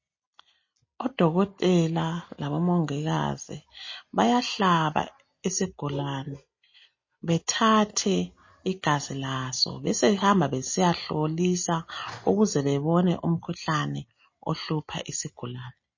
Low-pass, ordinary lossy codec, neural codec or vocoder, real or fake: 7.2 kHz; MP3, 32 kbps; none; real